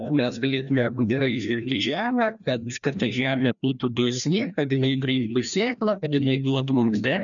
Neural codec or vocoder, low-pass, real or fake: codec, 16 kHz, 1 kbps, FreqCodec, larger model; 7.2 kHz; fake